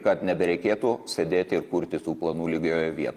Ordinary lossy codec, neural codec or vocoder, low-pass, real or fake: Opus, 32 kbps; vocoder, 44.1 kHz, 128 mel bands every 256 samples, BigVGAN v2; 14.4 kHz; fake